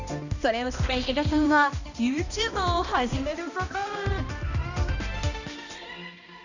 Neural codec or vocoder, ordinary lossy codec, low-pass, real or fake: codec, 16 kHz, 1 kbps, X-Codec, HuBERT features, trained on balanced general audio; none; 7.2 kHz; fake